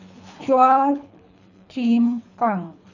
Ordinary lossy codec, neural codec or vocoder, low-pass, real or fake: none; codec, 24 kHz, 3 kbps, HILCodec; 7.2 kHz; fake